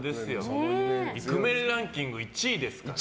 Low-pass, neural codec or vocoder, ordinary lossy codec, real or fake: none; none; none; real